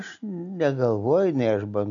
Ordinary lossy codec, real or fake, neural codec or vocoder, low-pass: MP3, 96 kbps; real; none; 7.2 kHz